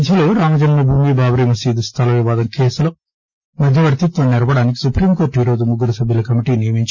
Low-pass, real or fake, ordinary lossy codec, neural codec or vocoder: none; real; none; none